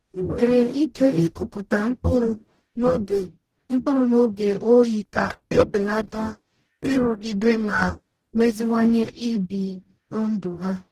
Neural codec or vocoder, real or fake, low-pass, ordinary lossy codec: codec, 44.1 kHz, 0.9 kbps, DAC; fake; 14.4 kHz; Opus, 16 kbps